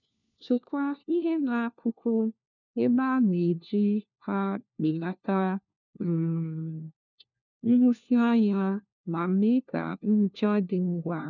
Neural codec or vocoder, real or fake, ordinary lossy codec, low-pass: codec, 16 kHz, 1 kbps, FunCodec, trained on LibriTTS, 50 frames a second; fake; none; 7.2 kHz